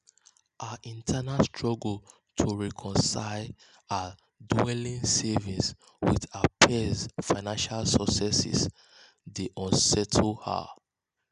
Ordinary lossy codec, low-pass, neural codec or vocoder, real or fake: none; 10.8 kHz; none; real